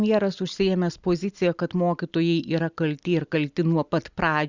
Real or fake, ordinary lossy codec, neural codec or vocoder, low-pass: real; Opus, 64 kbps; none; 7.2 kHz